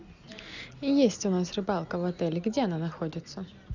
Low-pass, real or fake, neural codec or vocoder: 7.2 kHz; real; none